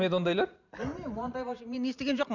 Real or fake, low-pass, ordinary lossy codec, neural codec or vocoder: fake; 7.2 kHz; none; vocoder, 44.1 kHz, 128 mel bands every 512 samples, BigVGAN v2